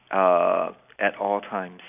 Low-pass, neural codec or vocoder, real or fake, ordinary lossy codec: 3.6 kHz; none; real; none